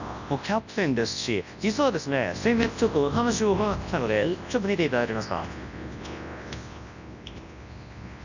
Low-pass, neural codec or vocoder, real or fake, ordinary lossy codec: 7.2 kHz; codec, 24 kHz, 0.9 kbps, WavTokenizer, large speech release; fake; none